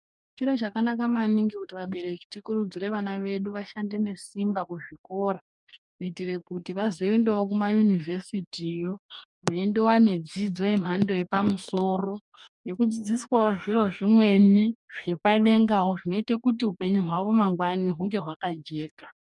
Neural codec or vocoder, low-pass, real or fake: codec, 44.1 kHz, 2.6 kbps, DAC; 10.8 kHz; fake